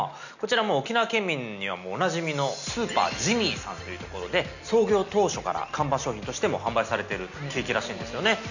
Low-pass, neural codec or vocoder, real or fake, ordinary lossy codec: 7.2 kHz; none; real; none